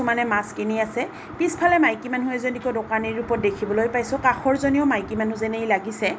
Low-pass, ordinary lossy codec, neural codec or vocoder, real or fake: none; none; none; real